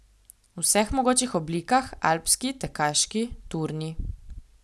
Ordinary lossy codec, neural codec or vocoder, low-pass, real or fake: none; none; none; real